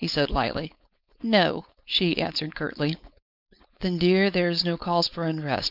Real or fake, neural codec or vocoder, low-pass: fake; codec, 16 kHz, 4.8 kbps, FACodec; 5.4 kHz